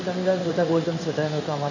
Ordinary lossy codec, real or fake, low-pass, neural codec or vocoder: none; fake; 7.2 kHz; codec, 16 kHz in and 24 kHz out, 1 kbps, XY-Tokenizer